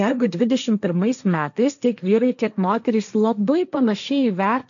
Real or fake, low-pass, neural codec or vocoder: fake; 7.2 kHz; codec, 16 kHz, 1.1 kbps, Voila-Tokenizer